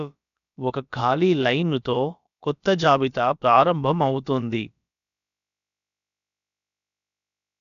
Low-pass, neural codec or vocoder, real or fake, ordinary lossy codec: 7.2 kHz; codec, 16 kHz, about 1 kbps, DyCAST, with the encoder's durations; fake; none